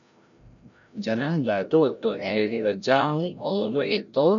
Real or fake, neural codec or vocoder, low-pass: fake; codec, 16 kHz, 0.5 kbps, FreqCodec, larger model; 7.2 kHz